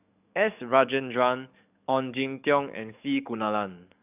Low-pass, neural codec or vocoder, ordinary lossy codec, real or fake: 3.6 kHz; codec, 44.1 kHz, 7.8 kbps, DAC; none; fake